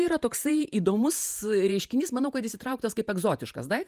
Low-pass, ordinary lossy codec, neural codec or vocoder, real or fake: 14.4 kHz; Opus, 32 kbps; vocoder, 48 kHz, 128 mel bands, Vocos; fake